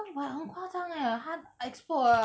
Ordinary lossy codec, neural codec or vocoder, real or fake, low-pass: none; none; real; none